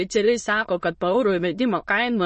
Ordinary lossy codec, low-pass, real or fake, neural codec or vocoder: MP3, 32 kbps; 9.9 kHz; fake; autoencoder, 22.05 kHz, a latent of 192 numbers a frame, VITS, trained on many speakers